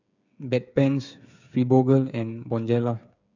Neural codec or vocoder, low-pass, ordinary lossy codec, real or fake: codec, 16 kHz, 8 kbps, FreqCodec, smaller model; 7.2 kHz; none; fake